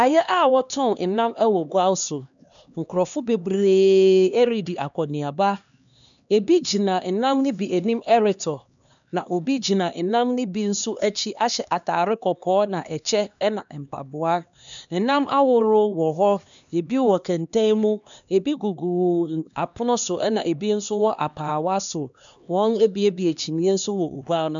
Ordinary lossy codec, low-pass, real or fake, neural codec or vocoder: MP3, 96 kbps; 7.2 kHz; fake; codec, 16 kHz, 2 kbps, X-Codec, HuBERT features, trained on LibriSpeech